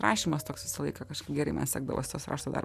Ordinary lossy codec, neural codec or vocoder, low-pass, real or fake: AAC, 96 kbps; none; 14.4 kHz; real